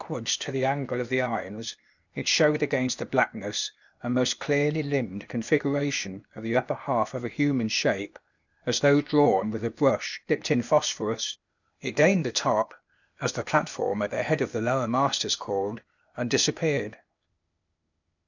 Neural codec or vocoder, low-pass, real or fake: codec, 16 kHz, 0.8 kbps, ZipCodec; 7.2 kHz; fake